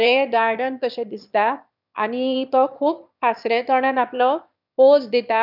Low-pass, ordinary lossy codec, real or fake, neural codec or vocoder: 5.4 kHz; none; fake; autoencoder, 22.05 kHz, a latent of 192 numbers a frame, VITS, trained on one speaker